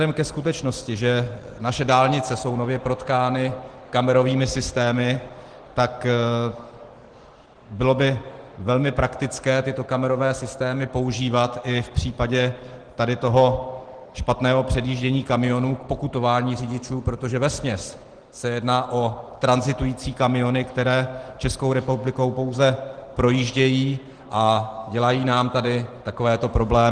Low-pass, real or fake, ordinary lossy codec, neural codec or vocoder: 9.9 kHz; real; Opus, 16 kbps; none